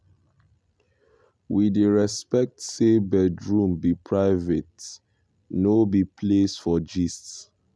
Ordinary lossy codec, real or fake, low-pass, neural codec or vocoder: none; real; none; none